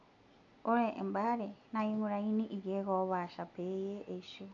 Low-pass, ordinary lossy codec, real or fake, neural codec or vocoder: 7.2 kHz; none; real; none